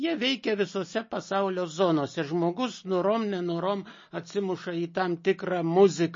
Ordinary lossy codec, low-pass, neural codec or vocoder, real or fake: MP3, 32 kbps; 7.2 kHz; none; real